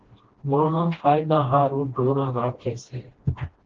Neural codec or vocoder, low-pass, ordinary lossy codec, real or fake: codec, 16 kHz, 1 kbps, FreqCodec, smaller model; 7.2 kHz; Opus, 16 kbps; fake